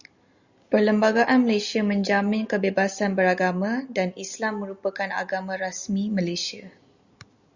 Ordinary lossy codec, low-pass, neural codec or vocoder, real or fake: Opus, 64 kbps; 7.2 kHz; none; real